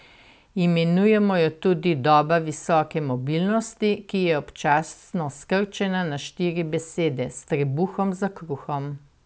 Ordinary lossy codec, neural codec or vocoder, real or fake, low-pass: none; none; real; none